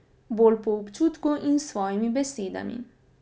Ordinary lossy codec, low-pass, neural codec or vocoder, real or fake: none; none; none; real